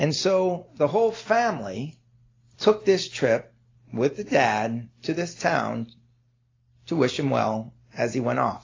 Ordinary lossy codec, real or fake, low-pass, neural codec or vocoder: AAC, 32 kbps; real; 7.2 kHz; none